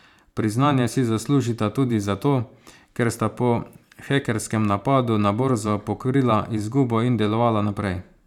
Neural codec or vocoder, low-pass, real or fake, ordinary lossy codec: vocoder, 44.1 kHz, 128 mel bands every 512 samples, BigVGAN v2; 19.8 kHz; fake; none